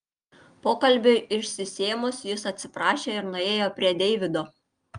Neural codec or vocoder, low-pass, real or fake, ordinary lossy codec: none; 9.9 kHz; real; Opus, 32 kbps